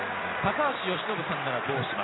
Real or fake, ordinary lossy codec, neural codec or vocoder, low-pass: real; AAC, 16 kbps; none; 7.2 kHz